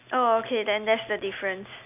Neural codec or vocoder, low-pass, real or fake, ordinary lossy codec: none; 3.6 kHz; real; none